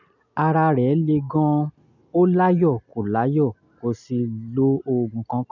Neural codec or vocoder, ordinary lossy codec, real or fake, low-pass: none; none; real; 7.2 kHz